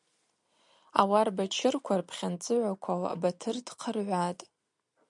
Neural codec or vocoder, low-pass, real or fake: none; 10.8 kHz; real